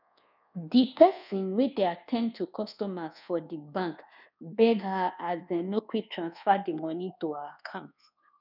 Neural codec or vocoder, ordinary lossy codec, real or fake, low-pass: codec, 24 kHz, 1.2 kbps, DualCodec; none; fake; 5.4 kHz